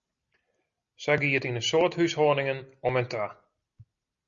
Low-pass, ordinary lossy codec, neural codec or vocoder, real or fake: 7.2 kHz; Opus, 64 kbps; none; real